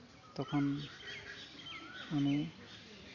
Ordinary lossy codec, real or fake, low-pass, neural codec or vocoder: none; real; 7.2 kHz; none